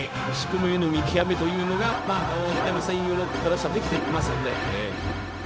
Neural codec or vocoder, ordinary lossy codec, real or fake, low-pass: codec, 16 kHz, 0.4 kbps, LongCat-Audio-Codec; none; fake; none